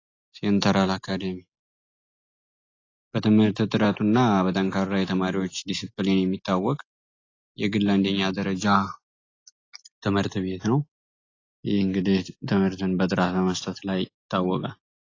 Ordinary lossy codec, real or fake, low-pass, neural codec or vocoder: AAC, 32 kbps; real; 7.2 kHz; none